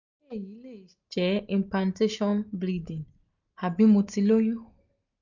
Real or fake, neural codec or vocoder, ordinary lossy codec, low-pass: real; none; none; 7.2 kHz